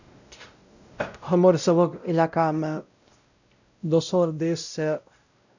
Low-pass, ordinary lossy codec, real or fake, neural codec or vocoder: 7.2 kHz; none; fake; codec, 16 kHz, 0.5 kbps, X-Codec, WavLM features, trained on Multilingual LibriSpeech